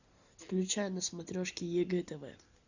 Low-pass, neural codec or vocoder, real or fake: 7.2 kHz; none; real